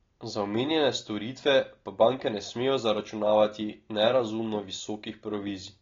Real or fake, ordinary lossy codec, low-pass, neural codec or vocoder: real; AAC, 32 kbps; 7.2 kHz; none